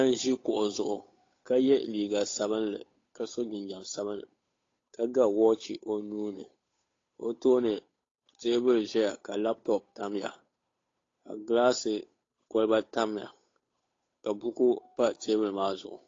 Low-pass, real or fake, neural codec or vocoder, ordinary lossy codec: 7.2 kHz; fake; codec, 16 kHz, 8 kbps, FunCodec, trained on Chinese and English, 25 frames a second; AAC, 32 kbps